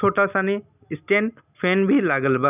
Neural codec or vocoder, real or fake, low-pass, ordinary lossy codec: none; real; 3.6 kHz; none